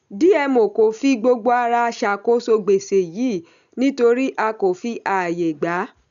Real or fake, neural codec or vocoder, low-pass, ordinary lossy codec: real; none; 7.2 kHz; none